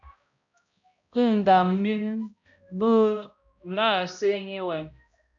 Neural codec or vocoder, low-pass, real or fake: codec, 16 kHz, 0.5 kbps, X-Codec, HuBERT features, trained on balanced general audio; 7.2 kHz; fake